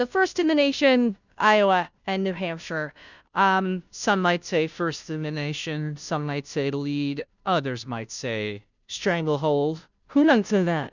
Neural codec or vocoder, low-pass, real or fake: codec, 16 kHz, 0.5 kbps, FunCodec, trained on Chinese and English, 25 frames a second; 7.2 kHz; fake